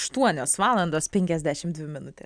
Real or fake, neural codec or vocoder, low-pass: real; none; 9.9 kHz